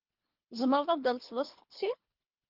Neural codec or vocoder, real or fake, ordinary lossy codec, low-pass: codec, 24 kHz, 3 kbps, HILCodec; fake; Opus, 24 kbps; 5.4 kHz